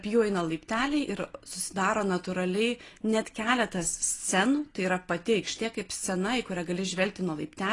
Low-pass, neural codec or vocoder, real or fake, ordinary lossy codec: 10.8 kHz; none; real; AAC, 32 kbps